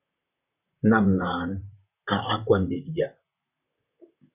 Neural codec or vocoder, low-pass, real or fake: vocoder, 44.1 kHz, 128 mel bands, Pupu-Vocoder; 3.6 kHz; fake